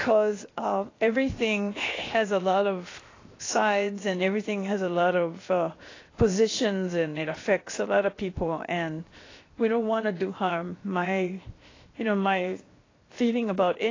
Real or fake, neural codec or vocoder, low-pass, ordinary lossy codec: fake; codec, 16 kHz, 0.7 kbps, FocalCodec; 7.2 kHz; AAC, 32 kbps